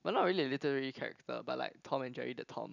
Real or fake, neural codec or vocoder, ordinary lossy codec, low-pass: real; none; none; 7.2 kHz